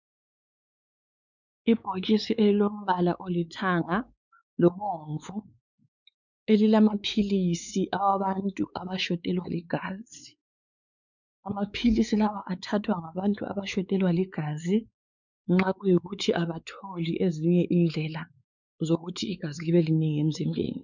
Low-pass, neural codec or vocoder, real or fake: 7.2 kHz; codec, 16 kHz, 4 kbps, X-Codec, WavLM features, trained on Multilingual LibriSpeech; fake